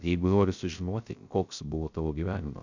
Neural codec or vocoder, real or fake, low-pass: codec, 16 kHz, 0.3 kbps, FocalCodec; fake; 7.2 kHz